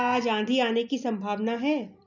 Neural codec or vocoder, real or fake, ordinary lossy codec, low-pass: none; real; none; 7.2 kHz